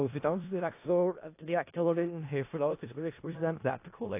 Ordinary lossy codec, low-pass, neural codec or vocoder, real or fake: AAC, 24 kbps; 3.6 kHz; codec, 16 kHz in and 24 kHz out, 0.4 kbps, LongCat-Audio-Codec, four codebook decoder; fake